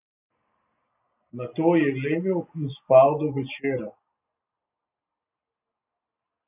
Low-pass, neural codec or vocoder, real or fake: 3.6 kHz; none; real